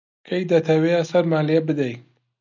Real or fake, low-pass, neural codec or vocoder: real; 7.2 kHz; none